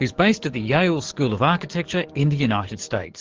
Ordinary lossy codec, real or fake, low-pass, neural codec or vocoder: Opus, 16 kbps; fake; 7.2 kHz; codec, 44.1 kHz, 7.8 kbps, DAC